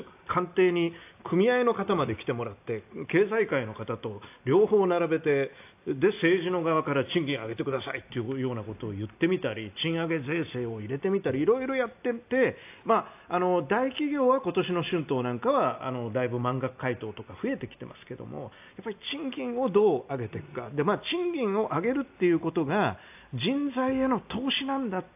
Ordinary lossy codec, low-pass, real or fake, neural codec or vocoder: AAC, 32 kbps; 3.6 kHz; real; none